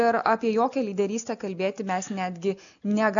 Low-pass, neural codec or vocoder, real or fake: 7.2 kHz; none; real